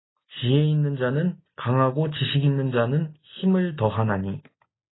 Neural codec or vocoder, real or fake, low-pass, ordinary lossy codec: none; real; 7.2 kHz; AAC, 16 kbps